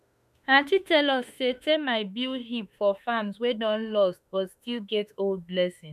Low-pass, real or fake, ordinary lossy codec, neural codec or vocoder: 14.4 kHz; fake; none; autoencoder, 48 kHz, 32 numbers a frame, DAC-VAE, trained on Japanese speech